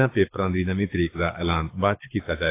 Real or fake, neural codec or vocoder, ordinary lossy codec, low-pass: fake; codec, 24 kHz, 6 kbps, HILCodec; AAC, 24 kbps; 3.6 kHz